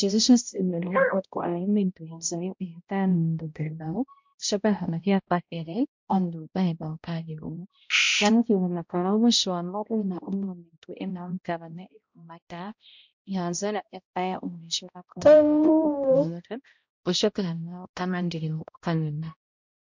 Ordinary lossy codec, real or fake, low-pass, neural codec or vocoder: MP3, 64 kbps; fake; 7.2 kHz; codec, 16 kHz, 0.5 kbps, X-Codec, HuBERT features, trained on balanced general audio